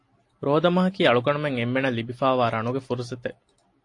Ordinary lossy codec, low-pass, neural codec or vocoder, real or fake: AAC, 48 kbps; 10.8 kHz; none; real